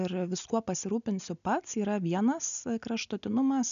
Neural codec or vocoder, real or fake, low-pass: none; real; 7.2 kHz